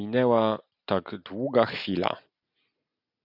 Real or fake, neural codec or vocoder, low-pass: real; none; 5.4 kHz